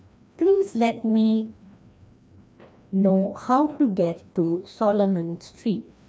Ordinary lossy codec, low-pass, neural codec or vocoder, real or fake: none; none; codec, 16 kHz, 1 kbps, FreqCodec, larger model; fake